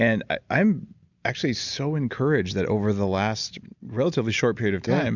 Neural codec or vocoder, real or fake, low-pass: none; real; 7.2 kHz